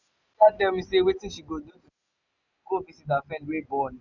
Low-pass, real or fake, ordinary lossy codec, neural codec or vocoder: 7.2 kHz; real; none; none